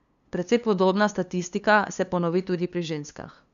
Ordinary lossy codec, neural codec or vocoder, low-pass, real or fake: none; codec, 16 kHz, 2 kbps, FunCodec, trained on LibriTTS, 25 frames a second; 7.2 kHz; fake